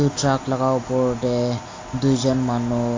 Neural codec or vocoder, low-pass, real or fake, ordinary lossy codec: none; 7.2 kHz; real; AAC, 32 kbps